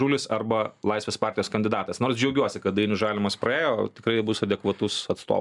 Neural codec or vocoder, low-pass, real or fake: none; 10.8 kHz; real